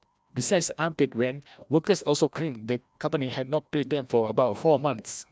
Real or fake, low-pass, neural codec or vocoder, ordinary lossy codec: fake; none; codec, 16 kHz, 1 kbps, FreqCodec, larger model; none